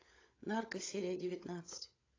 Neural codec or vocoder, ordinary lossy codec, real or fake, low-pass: codec, 16 kHz, 16 kbps, FunCodec, trained on LibriTTS, 50 frames a second; AAC, 32 kbps; fake; 7.2 kHz